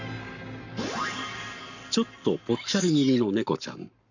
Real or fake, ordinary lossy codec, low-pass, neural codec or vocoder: fake; none; 7.2 kHz; codec, 44.1 kHz, 7.8 kbps, Pupu-Codec